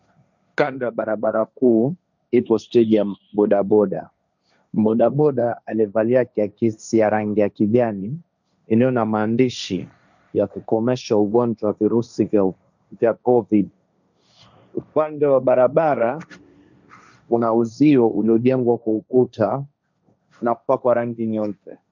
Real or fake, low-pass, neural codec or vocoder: fake; 7.2 kHz; codec, 16 kHz, 1.1 kbps, Voila-Tokenizer